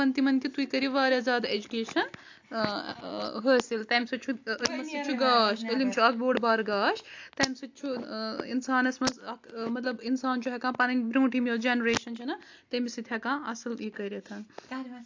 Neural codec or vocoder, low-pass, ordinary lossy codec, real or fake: none; 7.2 kHz; AAC, 48 kbps; real